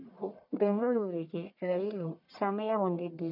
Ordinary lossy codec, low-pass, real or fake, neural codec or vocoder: none; 5.4 kHz; fake; codec, 44.1 kHz, 1.7 kbps, Pupu-Codec